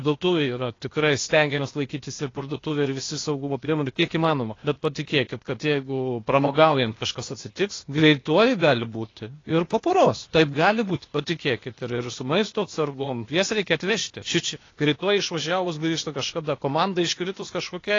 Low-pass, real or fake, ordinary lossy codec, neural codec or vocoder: 7.2 kHz; fake; AAC, 32 kbps; codec, 16 kHz, 0.8 kbps, ZipCodec